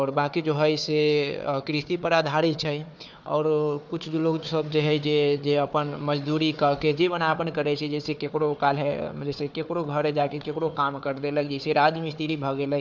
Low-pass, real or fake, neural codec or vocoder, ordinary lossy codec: none; fake; codec, 16 kHz, 4 kbps, FunCodec, trained on Chinese and English, 50 frames a second; none